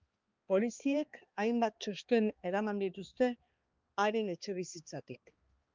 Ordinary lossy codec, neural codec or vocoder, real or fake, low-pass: Opus, 24 kbps; codec, 16 kHz, 2 kbps, X-Codec, HuBERT features, trained on balanced general audio; fake; 7.2 kHz